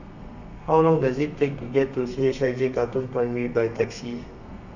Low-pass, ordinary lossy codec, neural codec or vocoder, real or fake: 7.2 kHz; none; codec, 32 kHz, 1.9 kbps, SNAC; fake